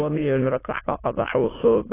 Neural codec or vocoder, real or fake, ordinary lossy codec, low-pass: autoencoder, 22.05 kHz, a latent of 192 numbers a frame, VITS, trained on many speakers; fake; AAC, 16 kbps; 3.6 kHz